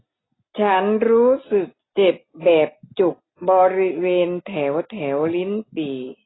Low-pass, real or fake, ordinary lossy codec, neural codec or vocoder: 7.2 kHz; real; AAC, 16 kbps; none